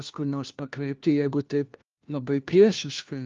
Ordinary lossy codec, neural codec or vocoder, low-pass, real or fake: Opus, 32 kbps; codec, 16 kHz, 1 kbps, FunCodec, trained on LibriTTS, 50 frames a second; 7.2 kHz; fake